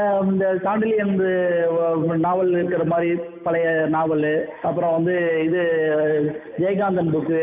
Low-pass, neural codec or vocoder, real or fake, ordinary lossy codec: 3.6 kHz; none; real; none